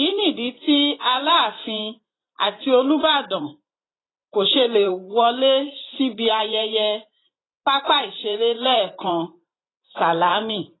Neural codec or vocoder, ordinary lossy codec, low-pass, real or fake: vocoder, 44.1 kHz, 128 mel bands every 512 samples, BigVGAN v2; AAC, 16 kbps; 7.2 kHz; fake